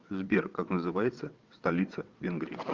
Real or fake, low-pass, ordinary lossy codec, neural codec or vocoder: fake; 7.2 kHz; Opus, 24 kbps; vocoder, 22.05 kHz, 80 mel bands, WaveNeXt